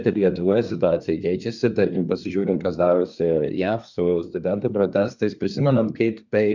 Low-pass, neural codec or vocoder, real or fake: 7.2 kHz; codec, 24 kHz, 1 kbps, SNAC; fake